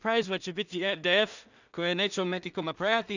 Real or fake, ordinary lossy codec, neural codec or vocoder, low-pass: fake; none; codec, 16 kHz in and 24 kHz out, 0.4 kbps, LongCat-Audio-Codec, two codebook decoder; 7.2 kHz